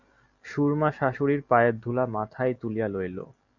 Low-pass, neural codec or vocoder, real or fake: 7.2 kHz; none; real